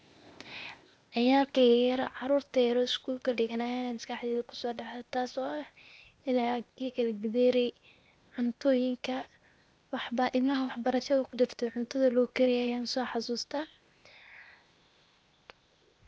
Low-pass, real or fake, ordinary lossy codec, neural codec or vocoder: none; fake; none; codec, 16 kHz, 0.8 kbps, ZipCodec